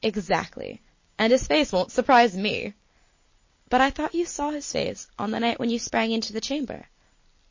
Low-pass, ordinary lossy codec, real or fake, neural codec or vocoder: 7.2 kHz; MP3, 32 kbps; real; none